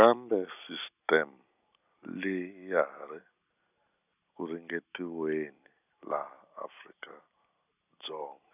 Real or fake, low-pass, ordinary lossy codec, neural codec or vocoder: real; 3.6 kHz; none; none